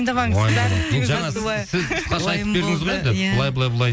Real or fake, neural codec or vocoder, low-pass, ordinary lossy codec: real; none; none; none